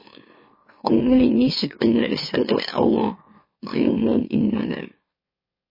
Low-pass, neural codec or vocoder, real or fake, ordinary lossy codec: 5.4 kHz; autoencoder, 44.1 kHz, a latent of 192 numbers a frame, MeloTTS; fake; MP3, 24 kbps